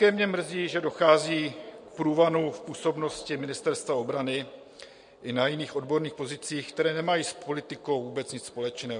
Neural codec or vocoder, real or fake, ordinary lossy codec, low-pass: none; real; MP3, 48 kbps; 9.9 kHz